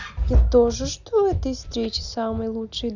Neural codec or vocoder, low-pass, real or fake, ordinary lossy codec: none; 7.2 kHz; real; none